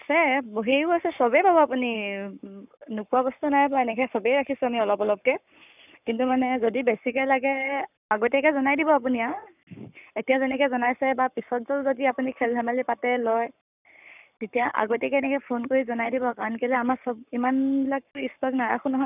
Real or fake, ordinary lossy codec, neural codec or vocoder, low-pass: fake; none; codec, 44.1 kHz, 7.8 kbps, Pupu-Codec; 3.6 kHz